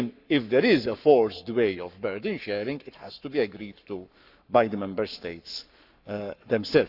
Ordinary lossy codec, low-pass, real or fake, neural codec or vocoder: none; 5.4 kHz; fake; codec, 44.1 kHz, 7.8 kbps, Pupu-Codec